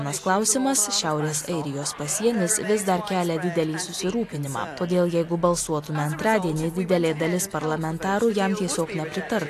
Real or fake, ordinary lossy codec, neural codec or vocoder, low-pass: real; AAC, 64 kbps; none; 14.4 kHz